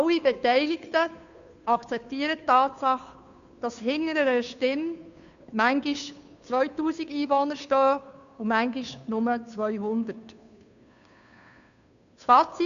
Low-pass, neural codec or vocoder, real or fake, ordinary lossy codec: 7.2 kHz; codec, 16 kHz, 2 kbps, FunCodec, trained on Chinese and English, 25 frames a second; fake; none